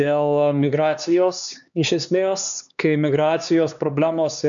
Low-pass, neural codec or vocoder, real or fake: 7.2 kHz; codec, 16 kHz, 2 kbps, X-Codec, HuBERT features, trained on LibriSpeech; fake